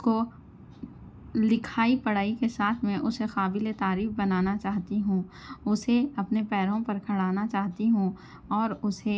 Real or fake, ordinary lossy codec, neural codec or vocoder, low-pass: real; none; none; none